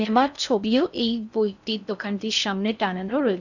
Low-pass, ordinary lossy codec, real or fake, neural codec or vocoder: 7.2 kHz; none; fake; codec, 16 kHz in and 24 kHz out, 0.6 kbps, FocalCodec, streaming, 4096 codes